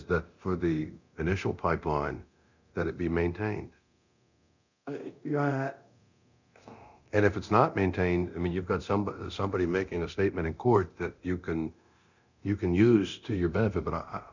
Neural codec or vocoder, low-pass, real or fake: codec, 24 kHz, 0.9 kbps, DualCodec; 7.2 kHz; fake